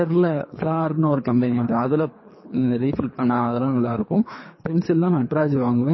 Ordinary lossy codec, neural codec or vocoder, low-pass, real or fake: MP3, 24 kbps; codec, 24 kHz, 3 kbps, HILCodec; 7.2 kHz; fake